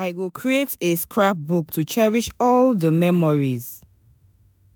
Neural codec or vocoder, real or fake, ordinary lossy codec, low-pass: autoencoder, 48 kHz, 32 numbers a frame, DAC-VAE, trained on Japanese speech; fake; none; none